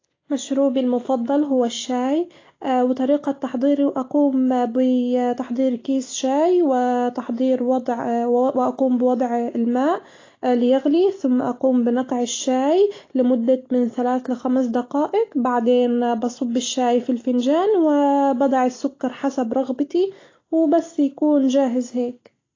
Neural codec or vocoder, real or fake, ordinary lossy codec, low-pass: none; real; AAC, 32 kbps; 7.2 kHz